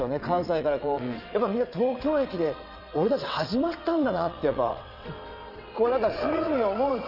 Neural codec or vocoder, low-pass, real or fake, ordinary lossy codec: vocoder, 22.05 kHz, 80 mel bands, WaveNeXt; 5.4 kHz; fake; none